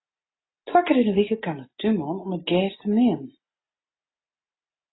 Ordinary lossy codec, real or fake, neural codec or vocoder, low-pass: AAC, 16 kbps; real; none; 7.2 kHz